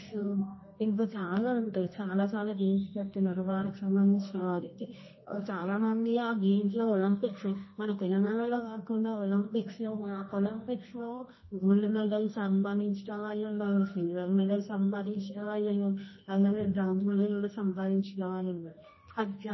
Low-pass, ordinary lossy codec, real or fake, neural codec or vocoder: 7.2 kHz; MP3, 24 kbps; fake; codec, 24 kHz, 0.9 kbps, WavTokenizer, medium music audio release